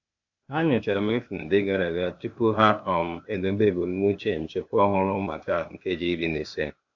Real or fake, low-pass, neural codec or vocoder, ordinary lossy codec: fake; 7.2 kHz; codec, 16 kHz, 0.8 kbps, ZipCodec; AAC, 48 kbps